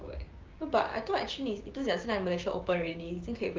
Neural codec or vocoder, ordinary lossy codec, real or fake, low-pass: codec, 16 kHz, 6 kbps, DAC; Opus, 24 kbps; fake; 7.2 kHz